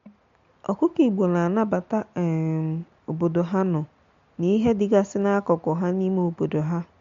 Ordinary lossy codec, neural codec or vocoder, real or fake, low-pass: MP3, 48 kbps; none; real; 7.2 kHz